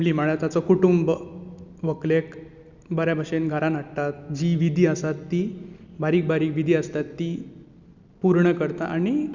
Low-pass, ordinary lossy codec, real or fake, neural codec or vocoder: 7.2 kHz; Opus, 64 kbps; real; none